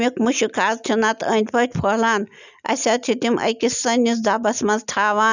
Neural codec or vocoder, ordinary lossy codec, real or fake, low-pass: none; none; real; 7.2 kHz